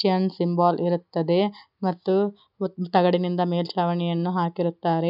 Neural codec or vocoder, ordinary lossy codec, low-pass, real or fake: none; none; 5.4 kHz; real